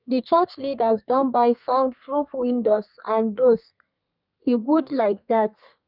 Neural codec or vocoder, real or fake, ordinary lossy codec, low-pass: codec, 32 kHz, 1.9 kbps, SNAC; fake; none; 5.4 kHz